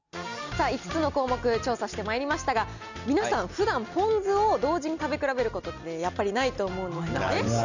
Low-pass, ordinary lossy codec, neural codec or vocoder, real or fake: 7.2 kHz; none; none; real